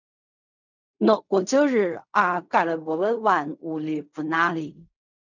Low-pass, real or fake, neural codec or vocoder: 7.2 kHz; fake; codec, 16 kHz in and 24 kHz out, 0.4 kbps, LongCat-Audio-Codec, fine tuned four codebook decoder